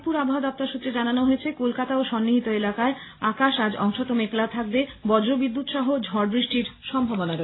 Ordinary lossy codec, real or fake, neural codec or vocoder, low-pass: AAC, 16 kbps; real; none; 7.2 kHz